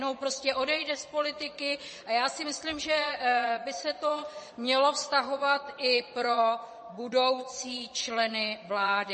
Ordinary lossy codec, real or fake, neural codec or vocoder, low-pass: MP3, 32 kbps; fake; vocoder, 22.05 kHz, 80 mel bands, Vocos; 9.9 kHz